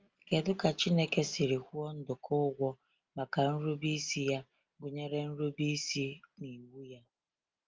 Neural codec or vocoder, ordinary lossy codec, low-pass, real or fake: none; Opus, 32 kbps; 7.2 kHz; real